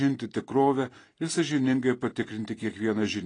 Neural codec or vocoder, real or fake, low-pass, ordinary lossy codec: none; real; 9.9 kHz; AAC, 32 kbps